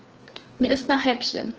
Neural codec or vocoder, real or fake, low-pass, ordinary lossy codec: codec, 16 kHz, 2 kbps, FreqCodec, larger model; fake; 7.2 kHz; Opus, 16 kbps